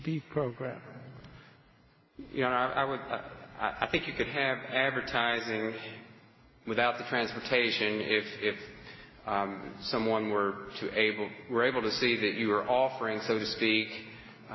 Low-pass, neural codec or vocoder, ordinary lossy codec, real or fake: 7.2 kHz; none; MP3, 24 kbps; real